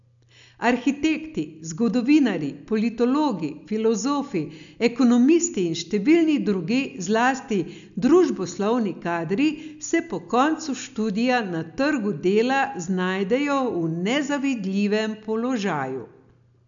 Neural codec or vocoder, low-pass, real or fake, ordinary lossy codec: none; 7.2 kHz; real; none